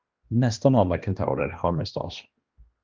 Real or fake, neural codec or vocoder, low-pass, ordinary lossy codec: fake; codec, 16 kHz, 1 kbps, X-Codec, HuBERT features, trained on balanced general audio; 7.2 kHz; Opus, 32 kbps